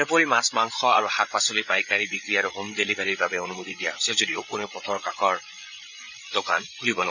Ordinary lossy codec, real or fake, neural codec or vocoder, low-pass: none; fake; codec, 16 kHz, 16 kbps, FreqCodec, larger model; 7.2 kHz